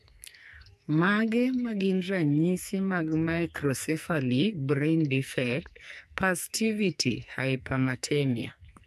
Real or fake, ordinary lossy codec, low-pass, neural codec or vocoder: fake; AAC, 96 kbps; 14.4 kHz; codec, 44.1 kHz, 2.6 kbps, SNAC